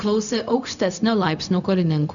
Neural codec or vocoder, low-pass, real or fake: codec, 16 kHz, 0.4 kbps, LongCat-Audio-Codec; 7.2 kHz; fake